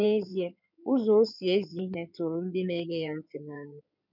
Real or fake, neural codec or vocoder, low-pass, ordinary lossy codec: fake; codec, 16 kHz in and 24 kHz out, 2.2 kbps, FireRedTTS-2 codec; 5.4 kHz; none